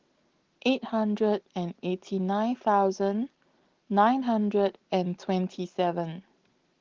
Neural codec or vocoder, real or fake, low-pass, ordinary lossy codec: none; real; 7.2 kHz; Opus, 16 kbps